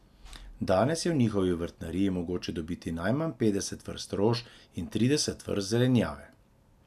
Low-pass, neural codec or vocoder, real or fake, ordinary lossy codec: 14.4 kHz; none; real; none